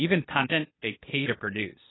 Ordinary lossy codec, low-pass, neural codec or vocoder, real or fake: AAC, 16 kbps; 7.2 kHz; codec, 16 kHz, 0.8 kbps, ZipCodec; fake